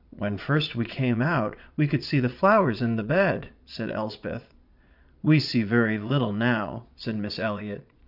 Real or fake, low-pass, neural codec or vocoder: fake; 5.4 kHz; vocoder, 22.05 kHz, 80 mel bands, Vocos